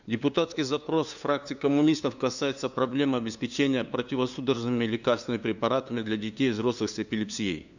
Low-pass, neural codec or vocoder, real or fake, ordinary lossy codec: 7.2 kHz; codec, 16 kHz, 2 kbps, FunCodec, trained on LibriTTS, 25 frames a second; fake; none